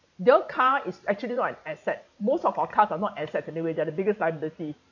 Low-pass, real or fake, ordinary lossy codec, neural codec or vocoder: 7.2 kHz; fake; none; vocoder, 44.1 kHz, 128 mel bands every 512 samples, BigVGAN v2